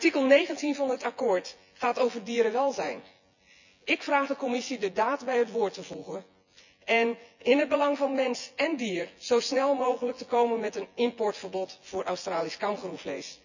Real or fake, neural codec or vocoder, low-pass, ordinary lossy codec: fake; vocoder, 24 kHz, 100 mel bands, Vocos; 7.2 kHz; none